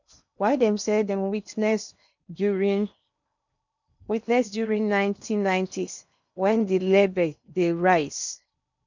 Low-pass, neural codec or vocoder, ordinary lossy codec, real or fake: 7.2 kHz; codec, 16 kHz in and 24 kHz out, 0.8 kbps, FocalCodec, streaming, 65536 codes; none; fake